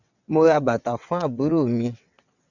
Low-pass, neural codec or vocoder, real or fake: 7.2 kHz; vocoder, 22.05 kHz, 80 mel bands, WaveNeXt; fake